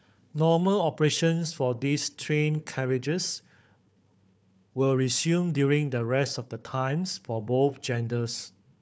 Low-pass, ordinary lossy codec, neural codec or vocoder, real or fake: none; none; codec, 16 kHz, 4 kbps, FunCodec, trained on Chinese and English, 50 frames a second; fake